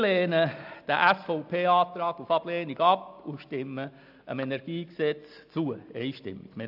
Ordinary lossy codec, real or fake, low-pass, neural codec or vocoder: AAC, 48 kbps; real; 5.4 kHz; none